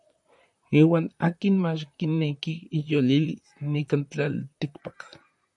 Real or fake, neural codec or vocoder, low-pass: fake; vocoder, 44.1 kHz, 128 mel bands, Pupu-Vocoder; 10.8 kHz